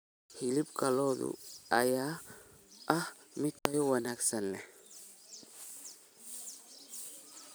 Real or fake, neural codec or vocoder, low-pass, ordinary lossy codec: fake; vocoder, 44.1 kHz, 128 mel bands every 256 samples, BigVGAN v2; none; none